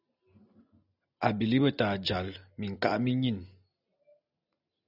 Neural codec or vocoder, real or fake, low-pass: none; real; 5.4 kHz